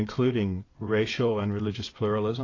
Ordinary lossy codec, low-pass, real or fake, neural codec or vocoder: AAC, 32 kbps; 7.2 kHz; fake; vocoder, 22.05 kHz, 80 mel bands, WaveNeXt